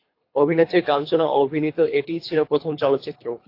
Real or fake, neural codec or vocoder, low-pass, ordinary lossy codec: fake; codec, 24 kHz, 3 kbps, HILCodec; 5.4 kHz; AAC, 32 kbps